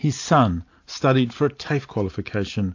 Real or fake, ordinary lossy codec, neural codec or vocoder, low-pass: real; AAC, 48 kbps; none; 7.2 kHz